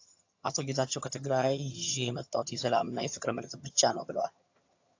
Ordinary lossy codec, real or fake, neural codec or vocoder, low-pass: AAC, 48 kbps; fake; vocoder, 22.05 kHz, 80 mel bands, HiFi-GAN; 7.2 kHz